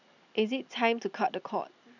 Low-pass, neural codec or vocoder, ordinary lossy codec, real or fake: 7.2 kHz; none; none; real